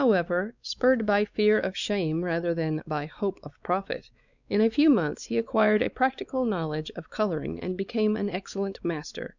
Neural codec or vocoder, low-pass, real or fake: codec, 16 kHz, 4 kbps, X-Codec, WavLM features, trained on Multilingual LibriSpeech; 7.2 kHz; fake